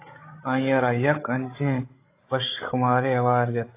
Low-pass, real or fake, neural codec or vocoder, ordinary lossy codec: 3.6 kHz; fake; codec, 16 kHz, 16 kbps, FreqCodec, larger model; AAC, 24 kbps